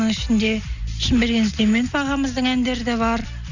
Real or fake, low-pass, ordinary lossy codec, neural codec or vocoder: real; 7.2 kHz; none; none